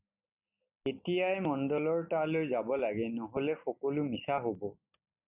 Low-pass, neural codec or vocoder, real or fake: 3.6 kHz; none; real